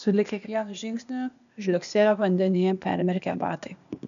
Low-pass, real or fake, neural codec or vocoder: 7.2 kHz; fake; codec, 16 kHz, 0.8 kbps, ZipCodec